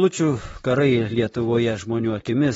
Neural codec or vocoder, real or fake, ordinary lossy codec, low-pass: vocoder, 44.1 kHz, 128 mel bands, Pupu-Vocoder; fake; AAC, 24 kbps; 19.8 kHz